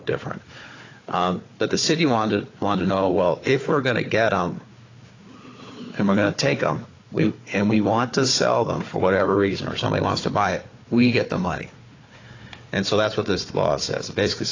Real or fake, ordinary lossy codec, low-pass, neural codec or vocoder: fake; AAC, 32 kbps; 7.2 kHz; codec, 16 kHz, 4 kbps, FunCodec, trained on Chinese and English, 50 frames a second